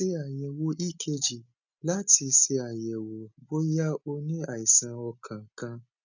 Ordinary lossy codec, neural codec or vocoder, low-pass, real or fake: none; none; 7.2 kHz; real